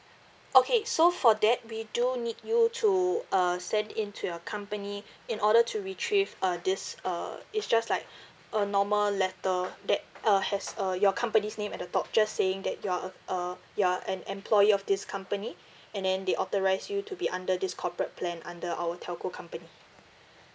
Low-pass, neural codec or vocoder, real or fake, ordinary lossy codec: none; none; real; none